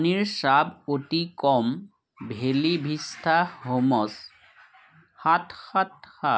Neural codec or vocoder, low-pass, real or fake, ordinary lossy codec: none; none; real; none